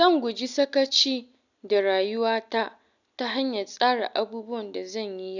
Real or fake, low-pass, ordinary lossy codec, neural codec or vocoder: real; 7.2 kHz; none; none